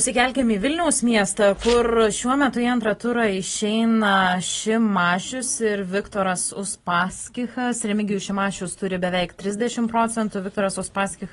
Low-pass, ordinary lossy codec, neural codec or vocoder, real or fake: 10.8 kHz; AAC, 32 kbps; none; real